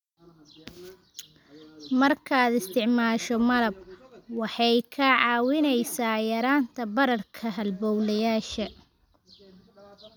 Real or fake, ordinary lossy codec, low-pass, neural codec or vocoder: real; none; 19.8 kHz; none